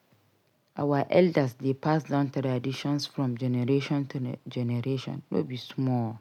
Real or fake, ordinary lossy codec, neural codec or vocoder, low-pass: real; none; none; 19.8 kHz